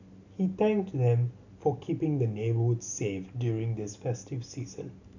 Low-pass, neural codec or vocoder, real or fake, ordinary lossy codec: 7.2 kHz; none; real; none